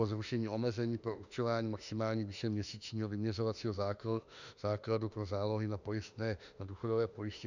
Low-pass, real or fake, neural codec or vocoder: 7.2 kHz; fake; autoencoder, 48 kHz, 32 numbers a frame, DAC-VAE, trained on Japanese speech